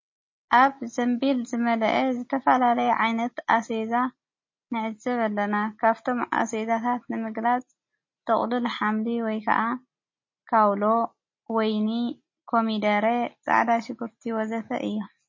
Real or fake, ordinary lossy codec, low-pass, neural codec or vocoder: real; MP3, 32 kbps; 7.2 kHz; none